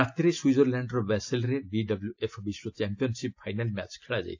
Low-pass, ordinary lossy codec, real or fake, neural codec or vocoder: 7.2 kHz; AAC, 48 kbps; real; none